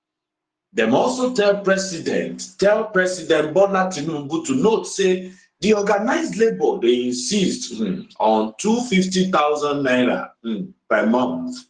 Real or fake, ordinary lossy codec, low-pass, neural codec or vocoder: fake; Opus, 24 kbps; 9.9 kHz; codec, 44.1 kHz, 7.8 kbps, Pupu-Codec